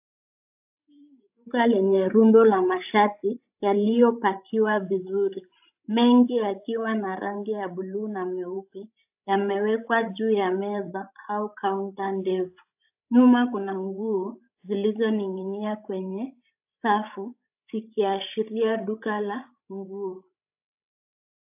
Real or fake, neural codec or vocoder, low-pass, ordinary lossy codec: fake; codec, 16 kHz, 16 kbps, FreqCodec, larger model; 3.6 kHz; AAC, 32 kbps